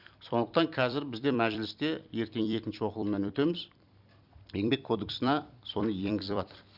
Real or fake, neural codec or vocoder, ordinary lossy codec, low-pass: fake; vocoder, 44.1 kHz, 128 mel bands every 256 samples, BigVGAN v2; Opus, 64 kbps; 5.4 kHz